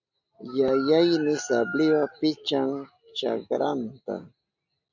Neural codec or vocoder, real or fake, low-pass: none; real; 7.2 kHz